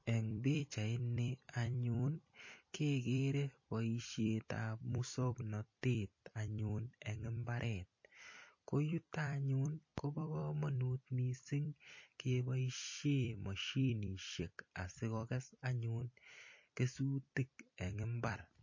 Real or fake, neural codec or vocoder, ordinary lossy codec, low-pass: fake; vocoder, 44.1 kHz, 128 mel bands every 256 samples, BigVGAN v2; MP3, 32 kbps; 7.2 kHz